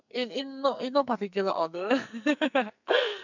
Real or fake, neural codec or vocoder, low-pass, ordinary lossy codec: fake; codec, 44.1 kHz, 2.6 kbps, SNAC; 7.2 kHz; none